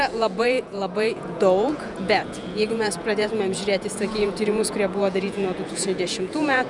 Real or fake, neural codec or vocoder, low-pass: fake; vocoder, 48 kHz, 128 mel bands, Vocos; 10.8 kHz